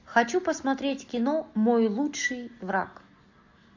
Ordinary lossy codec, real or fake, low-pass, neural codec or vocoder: AAC, 48 kbps; real; 7.2 kHz; none